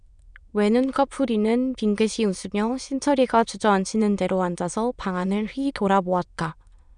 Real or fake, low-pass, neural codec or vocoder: fake; 9.9 kHz; autoencoder, 22.05 kHz, a latent of 192 numbers a frame, VITS, trained on many speakers